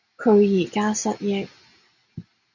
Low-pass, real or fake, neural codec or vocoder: 7.2 kHz; real; none